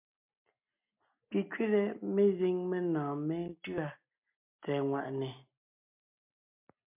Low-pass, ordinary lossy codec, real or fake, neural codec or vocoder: 3.6 kHz; MP3, 32 kbps; real; none